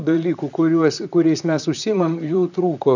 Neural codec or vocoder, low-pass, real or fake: vocoder, 44.1 kHz, 128 mel bands, Pupu-Vocoder; 7.2 kHz; fake